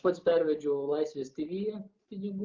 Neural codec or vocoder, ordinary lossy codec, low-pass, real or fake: none; Opus, 24 kbps; 7.2 kHz; real